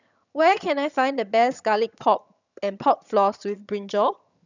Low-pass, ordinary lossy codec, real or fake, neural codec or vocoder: 7.2 kHz; none; fake; vocoder, 22.05 kHz, 80 mel bands, HiFi-GAN